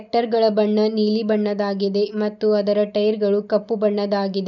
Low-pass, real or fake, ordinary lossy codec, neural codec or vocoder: 7.2 kHz; real; none; none